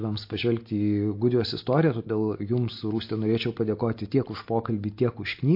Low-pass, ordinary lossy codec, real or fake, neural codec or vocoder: 5.4 kHz; MP3, 32 kbps; real; none